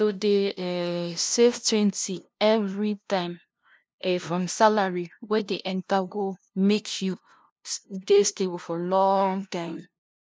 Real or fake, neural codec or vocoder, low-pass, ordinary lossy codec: fake; codec, 16 kHz, 0.5 kbps, FunCodec, trained on LibriTTS, 25 frames a second; none; none